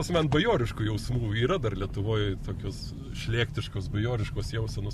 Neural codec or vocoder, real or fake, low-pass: none; real; 14.4 kHz